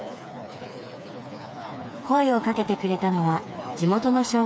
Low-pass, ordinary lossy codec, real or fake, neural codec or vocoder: none; none; fake; codec, 16 kHz, 4 kbps, FreqCodec, smaller model